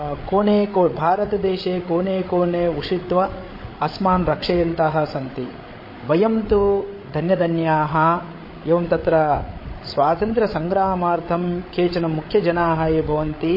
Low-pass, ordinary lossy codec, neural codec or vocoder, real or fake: 5.4 kHz; MP3, 24 kbps; codec, 16 kHz, 8 kbps, FreqCodec, larger model; fake